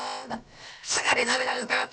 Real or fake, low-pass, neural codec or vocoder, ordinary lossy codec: fake; none; codec, 16 kHz, about 1 kbps, DyCAST, with the encoder's durations; none